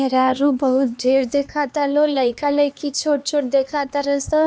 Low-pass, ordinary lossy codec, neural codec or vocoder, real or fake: none; none; codec, 16 kHz, 2 kbps, X-Codec, HuBERT features, trained on LibriSpeech; fake